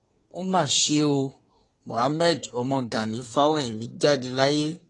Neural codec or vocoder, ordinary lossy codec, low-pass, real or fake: codec, 24 kHz, 1 kbps, SNAC; AAC, 32 kbps; 10.8 kHz; fake